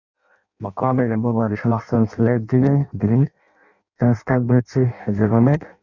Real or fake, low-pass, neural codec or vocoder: fake; 7.2 kHz; codec, 16 kHz in and 24 kHz out, 0.6 kbps, FireRedTTS-2 codec